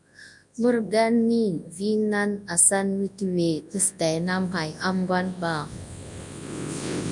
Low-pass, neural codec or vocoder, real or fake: 10.8 kHz; codec, 24 kHz, 0.9 kbps, WavTokenizer, large speech release; fake